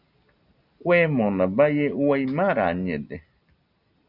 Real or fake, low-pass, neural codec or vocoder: real; 5.4 kHz; none